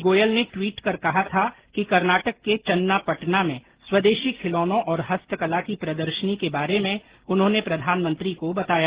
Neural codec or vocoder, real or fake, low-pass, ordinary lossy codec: none; real; 3.6 kHz; Opus, 16 kbps